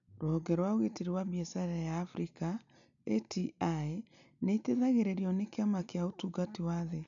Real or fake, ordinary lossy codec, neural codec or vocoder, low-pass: real; none; none; 7.2 kHz